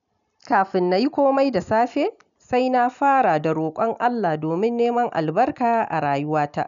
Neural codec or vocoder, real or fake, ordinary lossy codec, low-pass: none; real; none; 7.2 kHz